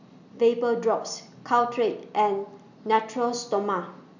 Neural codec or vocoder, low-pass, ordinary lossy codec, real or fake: none; 7.2 kHz; none; real